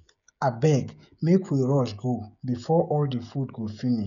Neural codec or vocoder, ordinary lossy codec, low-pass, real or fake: codec, 16 kHz, 16 kbps, FreqCodec, smaller model; MP3, 96 kbps; 7.2 kHz; fake